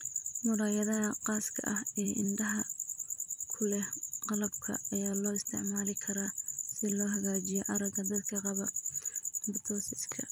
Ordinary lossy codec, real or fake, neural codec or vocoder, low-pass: none; fake; vocoder, 44.1 kHz, 128 mel bands every 256 samples, BigVGAN v2; none